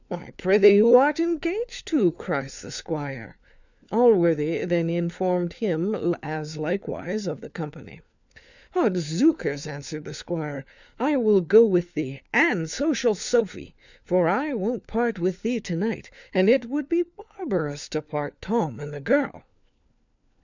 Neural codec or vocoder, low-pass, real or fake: codec, 16 kHz, 4 kbps, FunCodec, trained on LibriTTS, 50 frames a second; 7.2 kHz; fake